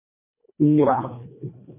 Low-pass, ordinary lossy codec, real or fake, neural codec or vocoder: 3.6 kHz; MP3, 24 kbps; fake; codec, 24 kHz, 1.5 kbps, HILCodec